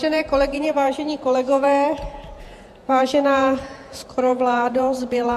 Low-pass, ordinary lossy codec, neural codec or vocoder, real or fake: 14.4 kHz; MP3, 64 kbps; vocoder, 48 kHz, 128 mel bands, Vocos; fake